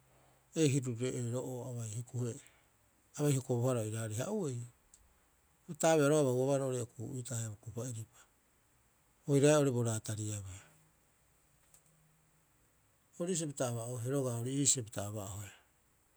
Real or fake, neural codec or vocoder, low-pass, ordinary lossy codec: real; none; none; none